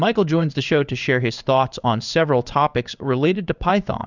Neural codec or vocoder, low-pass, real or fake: codec, 16 kHz in and 24 kHz out, 1 kbps, XY-Tokenizer; 7.2 kHz; fake